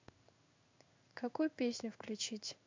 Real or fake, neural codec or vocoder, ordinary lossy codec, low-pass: fake; codec, 16 kHz in and 24 kHz out, 1 kbps, XY-Tokenizer; MP3, 64 kbps; 7.2 kHz